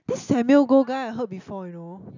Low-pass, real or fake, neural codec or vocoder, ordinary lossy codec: 7.2 kHz; real; none; none